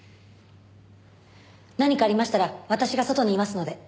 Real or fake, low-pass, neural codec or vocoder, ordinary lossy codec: real; none; none; none